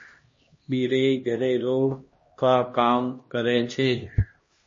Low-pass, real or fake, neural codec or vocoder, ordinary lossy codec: 7.2 kHz; fake; codec, 16 kHz, 1 kbps, X-Codec, HuBERT features, trained on LibriSpeech; MP3, 32 kbps